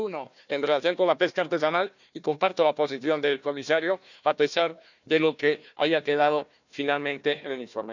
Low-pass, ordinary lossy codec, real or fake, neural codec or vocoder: 7.2 kHz; none; fake; codec, 16 kHz, 1 kbps, FunCodec, trained on Chinese and English, 50 frames a second